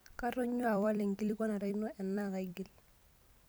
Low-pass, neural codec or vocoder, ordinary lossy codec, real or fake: none; vocoder, 44.1 kHz, 128 mel bands every 256 samples, BigVGAN v2; none; fake